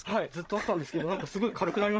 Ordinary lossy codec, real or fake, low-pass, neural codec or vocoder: none; fake; none; codec, 16 kHz, 16 kbps, FreqCodec, larger model